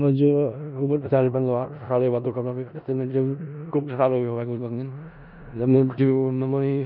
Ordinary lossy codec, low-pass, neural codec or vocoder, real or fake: none; 5.4 kHz; codec, 16 kHz in and 24 kHz out, 0.4 kbps, LongCat-Audio-Codec, four codebook decoder; fake